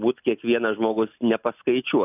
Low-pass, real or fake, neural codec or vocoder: 3.6 kHz; real; none